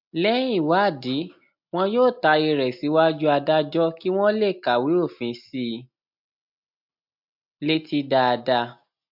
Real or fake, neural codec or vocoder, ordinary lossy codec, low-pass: real; none; MP3, 48 kbps; 5.4 kHz